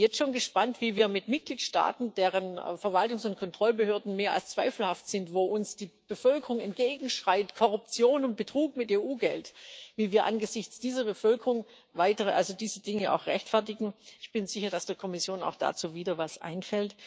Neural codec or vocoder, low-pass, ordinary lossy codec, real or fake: codec, 16 kHz, 6 kbps, DAC; none; none; fake